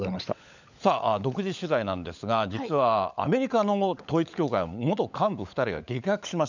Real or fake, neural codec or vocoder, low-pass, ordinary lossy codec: fake; codec, 16 kHz, 16 kbps, FunCodec, trained on LibriTTS, 50 frames a second; 7.2 kHz; none